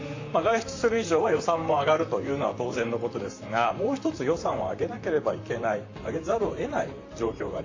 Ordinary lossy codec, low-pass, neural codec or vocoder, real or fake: AAC, 48 kbps; 7.2 kHz; vocoder, 44.1 kHz, 128 mel bands, Pupu-Vocoder; fake